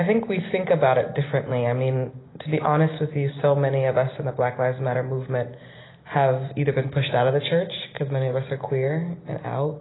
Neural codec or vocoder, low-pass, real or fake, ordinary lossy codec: none; 7.2 kHz; real; AAC, 16 kbps